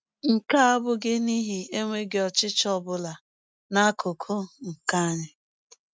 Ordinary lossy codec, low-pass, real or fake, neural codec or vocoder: none; none; real; none